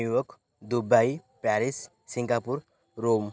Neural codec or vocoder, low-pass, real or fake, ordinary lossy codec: none; none; real; none